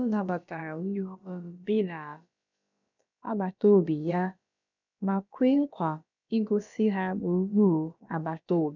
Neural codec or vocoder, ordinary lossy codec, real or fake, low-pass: codec, 16 kHz, about 1 kbps, DyCAST, with the encoder's durations; none; fake; 7.2 kHz